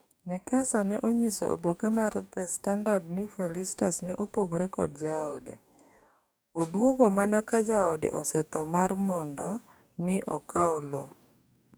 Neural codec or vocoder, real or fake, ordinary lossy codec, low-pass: codec, 44.1 kHz, 2.6 kbps, DAC; fake; none; none